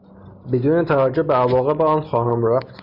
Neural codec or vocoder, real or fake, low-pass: none; real; 5.4 kHz